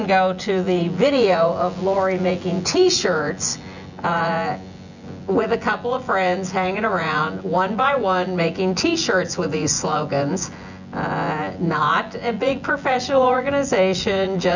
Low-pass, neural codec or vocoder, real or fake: 7.2 kHz; vocoder, 24 kHz, 100 mel bands, Vocos; fake